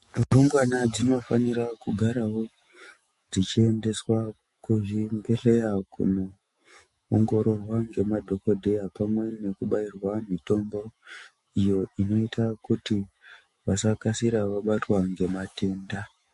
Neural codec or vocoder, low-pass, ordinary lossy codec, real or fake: autoencoder, 48 kHz, 128 numbers a frame, DAC-VAE, trained on Japanese speech; 14.4 kHz; MP3, 48 kbps; fake